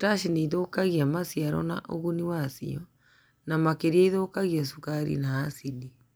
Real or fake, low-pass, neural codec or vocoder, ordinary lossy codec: real; none; none; none